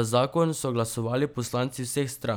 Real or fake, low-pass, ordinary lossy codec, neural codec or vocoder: real; none; none; none